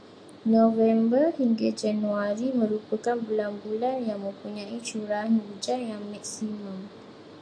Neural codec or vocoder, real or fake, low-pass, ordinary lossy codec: none; real; 9.9 kHz; AAC, 64 kbps